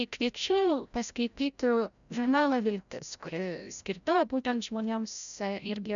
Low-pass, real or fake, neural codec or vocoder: 7.2 kHz; fake; codec, 16 kHz, 0.5 kbps, FreqCodec, larger model